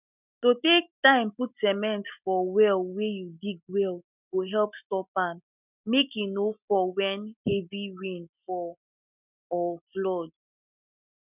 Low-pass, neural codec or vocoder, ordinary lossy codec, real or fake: 3.6 kHz; none; none; real